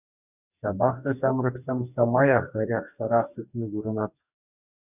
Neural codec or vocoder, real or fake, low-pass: codec, 44.1 kHz, 2.6 kbps, DAC; fake; 3.6 kHz